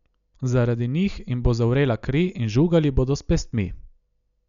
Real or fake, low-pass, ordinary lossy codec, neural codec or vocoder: real; 7.2 kHz; none; none